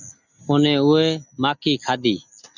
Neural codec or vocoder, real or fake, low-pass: none; real; 7.2 kHz